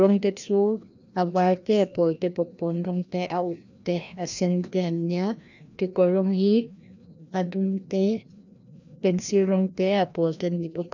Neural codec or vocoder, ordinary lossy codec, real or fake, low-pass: codec, 16 kHz, 1 kbps, FreqCodec, larger model; none; fake; 7.2 kHz